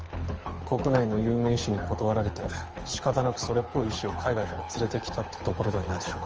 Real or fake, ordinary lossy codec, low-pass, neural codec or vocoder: fake; Opus, 24 kbps; 7.2 kHz; codec, 24 kHz, 6 kbps, HILCodec